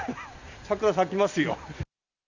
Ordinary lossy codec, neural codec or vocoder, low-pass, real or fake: none; none; 7.2 kHz; real